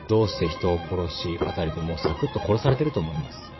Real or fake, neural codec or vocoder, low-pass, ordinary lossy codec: fake; vocoder, 22.05 kHz, 80 mel bands, Vocos; 7.2 kHz; MP3, 24 kbps